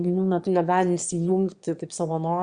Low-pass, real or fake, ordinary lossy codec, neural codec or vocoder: 9.9 kHz; fake; Opus, 64 kbps; autoencoder, 22.05 kHz, a latent of 192 numbers a frame, VITS, trained on one speaker